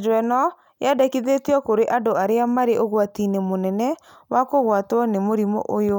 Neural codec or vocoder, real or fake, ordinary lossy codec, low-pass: none; real; none; none